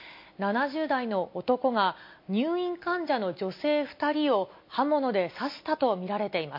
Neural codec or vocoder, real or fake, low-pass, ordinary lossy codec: none; real; 5.4 kHz; MP3, 32 kbps